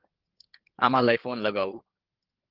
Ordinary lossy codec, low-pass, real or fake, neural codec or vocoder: Opus, 16 kbps; 5.4 kHz; fake; codec, 16 kHz in and 24 kHz out, 2.2 kbps, FireRedTTS-2 codec